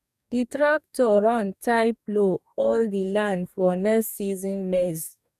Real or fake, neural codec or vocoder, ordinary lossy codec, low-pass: fake; codec, 44.1 kHz, 2.6 kbps, DAC; none; 14.4 kHz